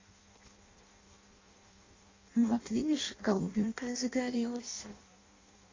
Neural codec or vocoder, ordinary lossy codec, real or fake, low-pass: codec, 16 kHz in and 24 kHz out, 0.6 kbps, FireRedTTS-2 codec; AAC, 32 kbps; fake; 7.2 kHz